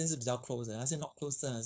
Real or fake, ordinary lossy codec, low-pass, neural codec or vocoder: fake; none; none; codec, 16 kHz, 4.8 kbps, FACodec